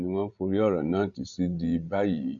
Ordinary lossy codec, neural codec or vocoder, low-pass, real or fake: Opus, 64 kbps; codec, 16 kHz, 16 kbps, FreqCodec, larger model; 7.2 kHz; fake